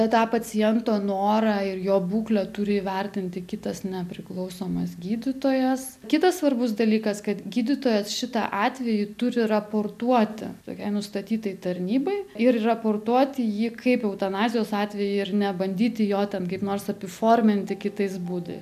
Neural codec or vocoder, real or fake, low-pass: none; real; 14.4 kHz